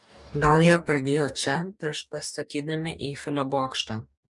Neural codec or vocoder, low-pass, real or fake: codec, 44.1 kHz, 2.6 kbps, DAC; 10.8 kHz; fake